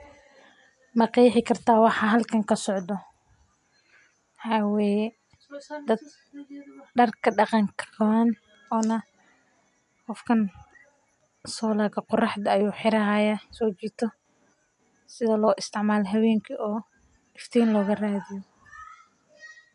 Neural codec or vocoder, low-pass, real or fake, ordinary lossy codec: none; 10.8 kHz; real; MP3, 64 kbps